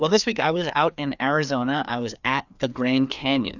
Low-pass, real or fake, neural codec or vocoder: 7.2 kHz; fake; codec, 16 kHz, 2 kbps, FreqCodec, larger model